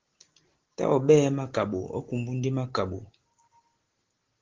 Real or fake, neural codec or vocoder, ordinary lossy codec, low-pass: real; none; Opus, 16 kbps; 7.2 kHz